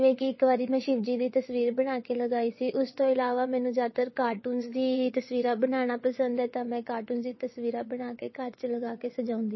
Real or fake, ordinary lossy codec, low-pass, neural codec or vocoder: fake; MP3, 24 kbps; 7.2 kHz; vocoder, 22.05 kHz, 80 mel bands, WaveNeXt